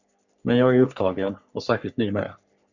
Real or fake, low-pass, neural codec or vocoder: fake; 7.2 kHz; codec, 44.1 kHz, 3.4 kbps, Pupu-Codec